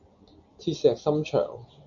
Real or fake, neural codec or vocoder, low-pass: real; none; 7.2 kHz